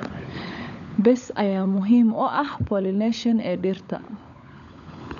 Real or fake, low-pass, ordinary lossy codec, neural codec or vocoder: fake; 7.2 kHz; none; codec, 16 kHz, 16 kbps, FunCodec, trained on LibriTTS, 50 frames a second